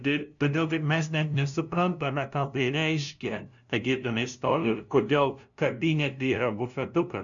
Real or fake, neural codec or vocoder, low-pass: fake; codec, 16 kHz, 0.5 kbps, FunCodec, trained on LibriTTS, 25 frames a second; 7.2 kHz